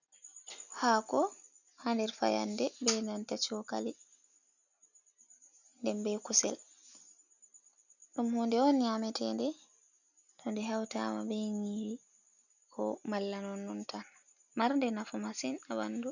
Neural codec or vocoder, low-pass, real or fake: none; 7.2 kHz; real